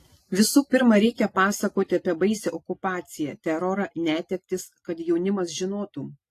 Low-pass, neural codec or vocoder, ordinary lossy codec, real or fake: 14.4 kHz; none; AAC, 48 kbps; real